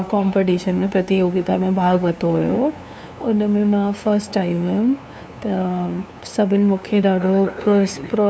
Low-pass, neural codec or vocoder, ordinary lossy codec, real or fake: none; codec, 16 kHz, 2 kbps, FunCodec, trained on LibriTTS, 25 frames a second; none; fake